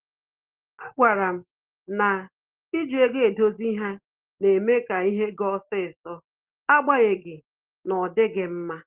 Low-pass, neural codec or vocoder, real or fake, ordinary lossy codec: 3.6 kHz; none; real; Opus, 16 kbps